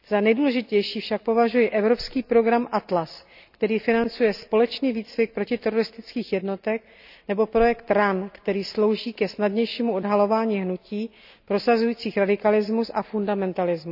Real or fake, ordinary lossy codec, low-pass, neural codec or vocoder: real; none; 5.4 kHz; none